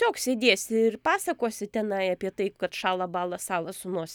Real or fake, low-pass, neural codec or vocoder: real; 19.8 kHz; none